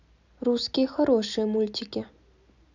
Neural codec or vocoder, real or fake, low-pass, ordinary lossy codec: none; real; 7.2 kHz; none